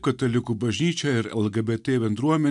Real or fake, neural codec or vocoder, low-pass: real; none; 10.8 kHz